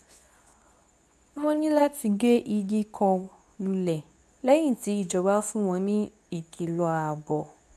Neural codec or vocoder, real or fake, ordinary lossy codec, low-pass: codec, 24 kHz, 0.9 kbps, WavTokenizer, medium speech release version 2; fake; none; none